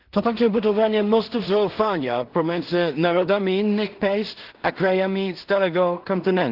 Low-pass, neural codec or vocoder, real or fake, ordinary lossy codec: 5.4 kHz; codec, 16 kHz in and 24 kHz out, 0.4 kbps, LongCat-Audio-Codec, two codebook decoder; fake; Opus, 16 kbps